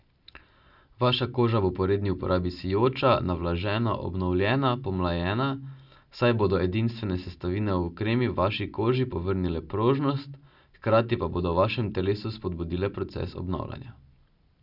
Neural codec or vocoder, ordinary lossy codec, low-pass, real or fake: none; none; 5.4 kHz; real